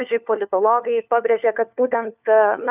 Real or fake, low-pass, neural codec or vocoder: fake; 3.6 kHz; codec, 16 kHz, 4 kbps, FunCodec, trained on LibriTTS, 50 frames a second